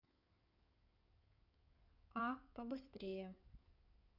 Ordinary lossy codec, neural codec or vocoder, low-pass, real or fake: none; codec, 16 kHz in and 24 kHz out, 2.2 kbps, FireRedTTS-2 codec; 5.4 kHz; fake